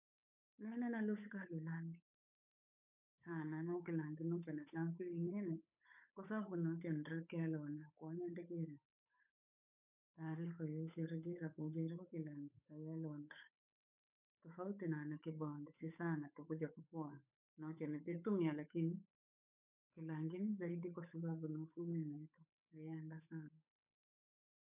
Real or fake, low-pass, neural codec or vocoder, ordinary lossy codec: fake; 3.6 kHz; codec, 16 kHz, 8 kbps, FunCodec, trained on Chinese and English, 25 frames a second; none